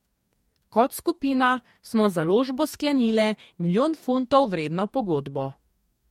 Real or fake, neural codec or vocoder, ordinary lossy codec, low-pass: fake; codec, 44.1 kHz, 2.6 kbps, DAC; MP3, 64 kbps; 19.8 kHz